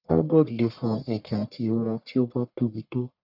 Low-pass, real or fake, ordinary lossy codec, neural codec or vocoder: 5.4 kHz; fake; none; codec, 44.1 kHz, 1.7 kbps, Pupu-Codec